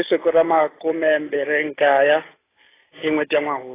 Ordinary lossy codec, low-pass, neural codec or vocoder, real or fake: AAC, 16 kbps; 3.6 kHz; none; real